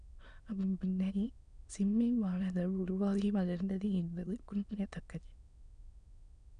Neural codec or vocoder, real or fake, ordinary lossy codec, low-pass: autoencoder, 22.05 kHz, a latent of 192 numbers a frame, VITS, trained on many speakers; fake; none; 9.9 kHz